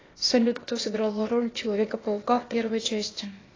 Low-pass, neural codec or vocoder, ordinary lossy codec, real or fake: 7.2 kHz; codec, 16 kHz, 0.8 kbps, ZipCodec; AAC, 32 kbps; fake